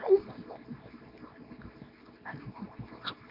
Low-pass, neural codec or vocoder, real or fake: 5.4 kHz; codec, 24 kHz, 0.9 kbps, WavTokenizer, small release; fake